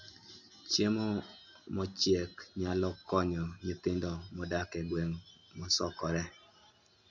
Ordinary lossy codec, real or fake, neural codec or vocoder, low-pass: none; real; none; 7.2 kHz